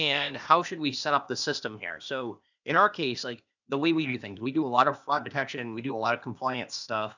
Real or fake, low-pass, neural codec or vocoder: fake; 7.2 kHz; codec, 16 kHz, 0.8 kbps, ZipCodec